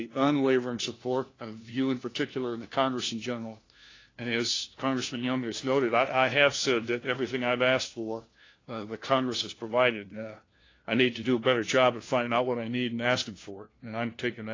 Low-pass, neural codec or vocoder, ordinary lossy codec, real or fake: 7.2 kHz; codec, 16 kHz, 1 kbps, FunCodec, trained on LibriTTS, 50 frames a second; AAC, 32 kbps; fake